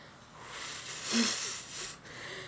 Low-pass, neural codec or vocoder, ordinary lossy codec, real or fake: none; none; none; real